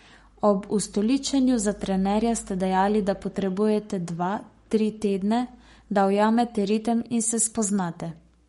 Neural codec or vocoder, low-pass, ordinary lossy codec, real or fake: codec, 44.1 kHz, 7.8 kbps, Pupu-Codec; 19.8 kHz; MP3, 48 kbps; fake